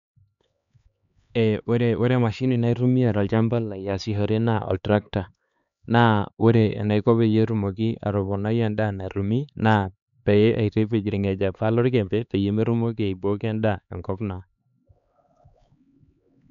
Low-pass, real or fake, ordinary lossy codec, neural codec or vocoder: 7.2 kHz; fake; none; codec, 16 kHz, 4 kbps, X-Codec, HuBERT features, trained on LibriSpeech